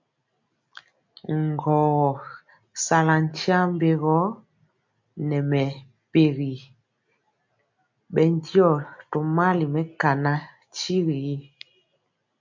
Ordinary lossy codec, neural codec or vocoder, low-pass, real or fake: MP3, 48 kbps; none; 7.2 kHz; real